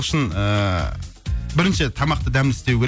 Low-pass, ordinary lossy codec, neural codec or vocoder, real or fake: none; none; none; real